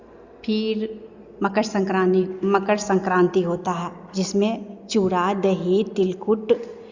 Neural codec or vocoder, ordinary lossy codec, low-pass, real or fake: none; Opus, 64 kbps; 7.2 kHz; real